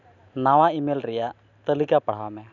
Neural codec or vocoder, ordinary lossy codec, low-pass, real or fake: none; none; 7.2 kHz; real